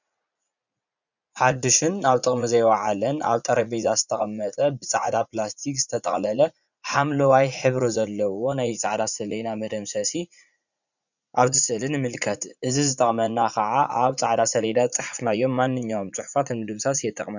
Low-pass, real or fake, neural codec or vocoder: 7.2 kHz; fake; vocoder, 44.1 kHz, 80 mel bands, Vocos